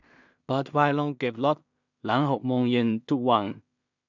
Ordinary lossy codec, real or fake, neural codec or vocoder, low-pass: none; fake; codec, 16 kHz in and 24 kHz out, 0.4 kbps, LongCat-Audio-Codec, two codebook decoder; 7.2 kHz